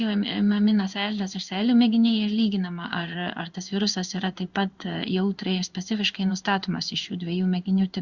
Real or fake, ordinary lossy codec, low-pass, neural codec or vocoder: fake; Opus, 64 kbps; 7.2 kHz; codec, 16 kHz in and 24 kHz out, 1 kbps, XY-Tokenizer